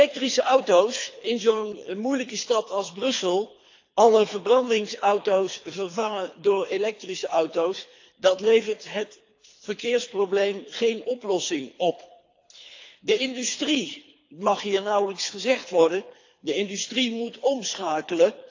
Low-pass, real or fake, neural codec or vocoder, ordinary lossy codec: 7.2 kHz; fake; codec, 24 kHz, 3 kbps, HILCodec; AAC, 48 kbps